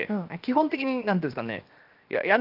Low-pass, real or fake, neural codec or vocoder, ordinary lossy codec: 5.4 kHz; fake; codec, 16 kHz, 0.7 kbps, FocalCodec; Opus, 24 kbps